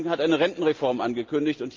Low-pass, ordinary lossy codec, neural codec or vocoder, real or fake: 7.2 kHz; Opus, 32 kbps; none; real